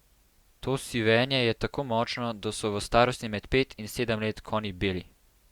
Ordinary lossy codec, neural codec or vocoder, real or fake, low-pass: Opus, 64 kbps; none; real; 19.8 kHz